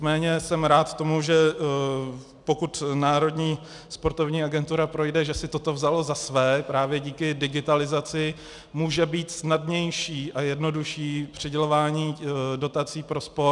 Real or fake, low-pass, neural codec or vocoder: real; 10.8 kHz; none